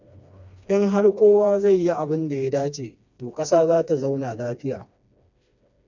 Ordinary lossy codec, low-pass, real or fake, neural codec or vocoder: none; 7.2 kHz; fake; codec, 16 kHz, 2 kbps, FreqCodec, smaller model